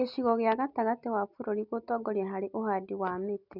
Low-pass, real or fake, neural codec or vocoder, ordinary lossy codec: 5.4 kHz; real; none; none